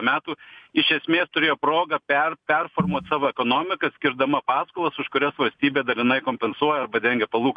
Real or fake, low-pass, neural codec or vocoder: real; 9.9 kHz; none